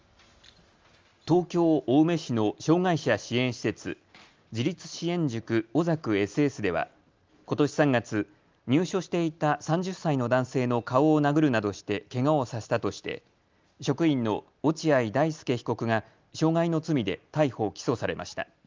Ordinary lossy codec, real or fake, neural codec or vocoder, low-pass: Opus, 32 kbps; real; none; 7.2 kHz